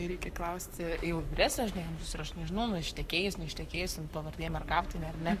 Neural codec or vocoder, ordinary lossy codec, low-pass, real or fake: codec, 44.1 kHz, 7.8 kbps, Pupu-Codec; Opus, 64 kbps; 14.4 kHz; fake